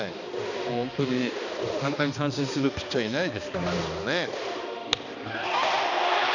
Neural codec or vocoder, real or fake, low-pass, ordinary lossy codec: codec, 16 kHz, 2 kbps, X-Codec, HuBERT features, trained on balanced general audio; fake; 7.2 kHz; none